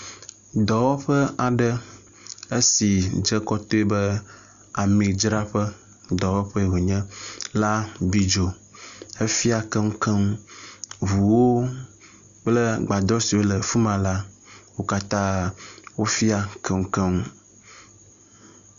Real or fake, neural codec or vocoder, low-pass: real; none; 7.2 kHz